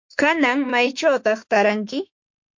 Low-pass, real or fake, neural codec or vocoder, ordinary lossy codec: 7.2 kHz; fake; autoencoder, 48 kHz, 32 numbers a frame, DAC-VAE, trained on Japanese speech; MP3, 48 kbps